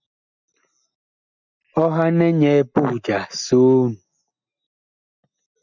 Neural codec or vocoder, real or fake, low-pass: none; real; 7.2 kHz